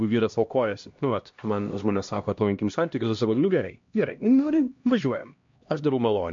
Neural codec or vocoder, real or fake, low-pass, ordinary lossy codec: codec, 16 kHz, 1 kbps, X-Codec, HuBERT features, trained on LibriSpeech; fake; 7.2 kHz; MP3, 48 kbps